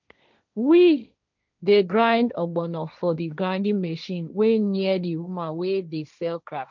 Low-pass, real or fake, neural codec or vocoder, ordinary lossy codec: 7.2 kHz; fake; codec, 16 kHz, 1.1 kbps, Voila-Tokenizer; none